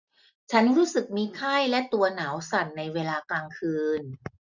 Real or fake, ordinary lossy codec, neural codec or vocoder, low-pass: real; none; none; 7.2 kHz